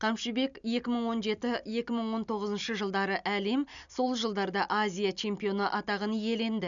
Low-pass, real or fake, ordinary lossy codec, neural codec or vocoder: 7.2 kHz; real; none; none